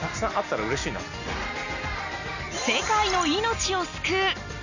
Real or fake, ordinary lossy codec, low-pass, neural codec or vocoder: real; none; 7.2 kHz; none